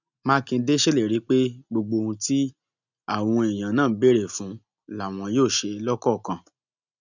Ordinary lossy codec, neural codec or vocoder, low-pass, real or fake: none; none; 7.2 kHz; real